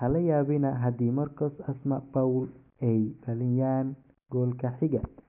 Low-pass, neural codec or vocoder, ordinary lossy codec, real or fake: 3.6 kHz; none; none; real